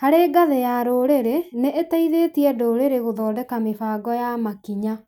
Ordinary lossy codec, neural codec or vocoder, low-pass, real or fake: none; none; 19.8 kHz; real